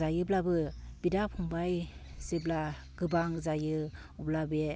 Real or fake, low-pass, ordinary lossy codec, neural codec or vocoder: real; none; none; none